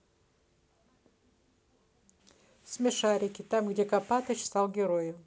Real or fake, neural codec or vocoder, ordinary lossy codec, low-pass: real; none; none; none